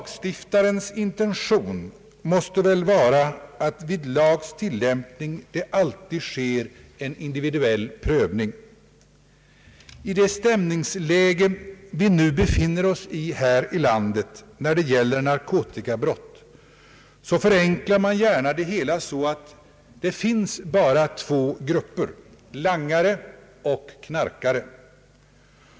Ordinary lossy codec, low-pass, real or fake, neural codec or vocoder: none; none; real; none